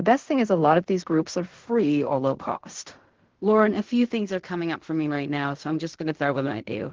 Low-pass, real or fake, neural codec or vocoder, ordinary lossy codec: 7.2 kHz; fake; codec, 16 kHz in and 24 kHz out, 0.4 kbps, LongCat-Audio-Codec, fine tuned four codebook decoder; Opus, 16 kbps